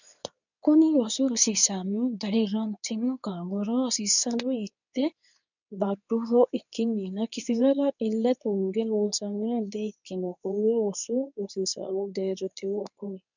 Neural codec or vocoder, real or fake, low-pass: codec, 24 kHz, 0.9 kbps, WavTokenizer, medium speech release version 2; fake; 7.2 kHz